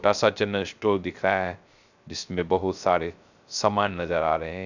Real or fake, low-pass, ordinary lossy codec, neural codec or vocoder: fake; 7.2 kHz; none; codec, 16 kHz, 0.3 kbps, FocalCodec